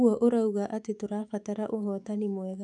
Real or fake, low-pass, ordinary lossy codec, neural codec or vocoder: fake; 10.8 kHz; none; autoencoder, 48 kHz, 128 numbers a frame, DAC-VAE, trained on Japanese speech